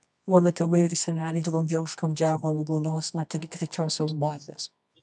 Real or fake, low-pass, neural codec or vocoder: fake; 10.8 kHz; codec, 24 kHz, 0.9 kbps, WavTokenizer, medium music audio release